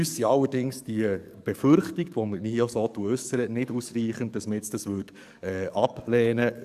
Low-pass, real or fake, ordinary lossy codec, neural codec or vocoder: 14.4 kHz; fake; AAC, 96 kbps; codec, 44.1 kHz, 7.8 kbps, DAC